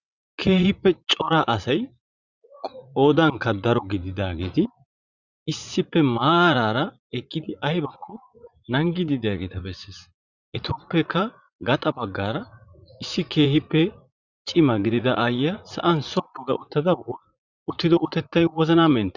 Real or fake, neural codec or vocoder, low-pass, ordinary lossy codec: fake; vocoder, 44.1 kHz, 80 mel bands, Vocos; 7.2 kHz; Opus, 64 kbps